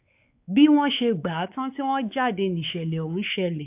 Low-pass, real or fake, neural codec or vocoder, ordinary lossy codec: 3.6 kHz; fake; codec, 16 kHz, 4 kbps, X-Codec, WavLM features, trained on Multilingual LibriSpeech; AAC, 32 kbps